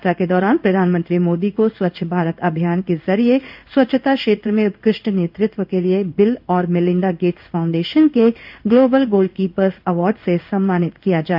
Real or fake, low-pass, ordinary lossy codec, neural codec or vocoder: fake; 5.4 kHz; none; codec, 16 kHz in and 24 kHz out, 1 kbps, XY-Tokenizer